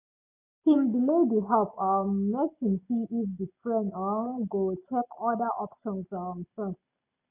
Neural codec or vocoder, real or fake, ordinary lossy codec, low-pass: none; real; none; 3.6 kHz